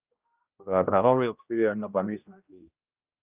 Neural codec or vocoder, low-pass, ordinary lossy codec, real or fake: codec, 16 kHz, 0.5 kbps, X-Codec, HuBERT features, trained on general audio; 3.6 kHz; Opus, 24 kbps; fake